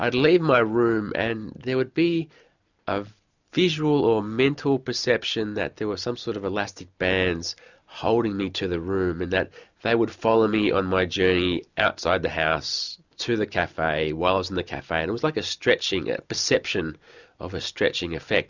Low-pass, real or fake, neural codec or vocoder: 7.2 kHz; real; none